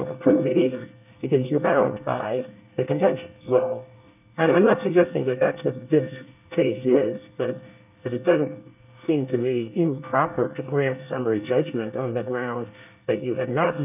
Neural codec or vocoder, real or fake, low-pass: codec, 24 kHz, 1 kbps, SNAC; fake; 3.6 kHz